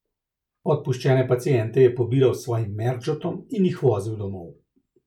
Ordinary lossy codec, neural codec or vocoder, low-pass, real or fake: none; none; 19.8 kHz; real